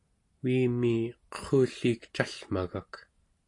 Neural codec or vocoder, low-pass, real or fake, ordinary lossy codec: none; 10.8 kHz; real; AAC, 48 kbps